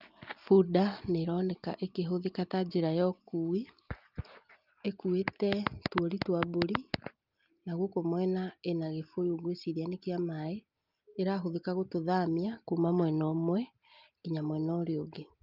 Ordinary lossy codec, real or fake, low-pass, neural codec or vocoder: Opus, 24 kbps; real; 5.4 kHz; none